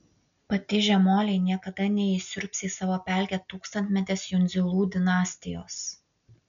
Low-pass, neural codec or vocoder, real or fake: 7.2 kHz; none; real